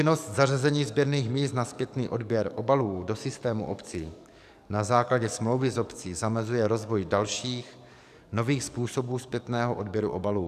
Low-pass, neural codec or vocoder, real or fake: 14.4 kHz; codec, 44.1 kHz, 7.8 kbps, DAC; fake